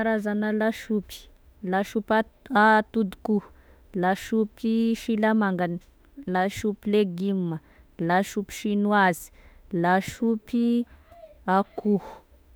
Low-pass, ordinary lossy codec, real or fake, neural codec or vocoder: none; none; fake; autoencoder, 48 kHz, 32 numbers a frame, DAC-VAE, trained on Japanese speech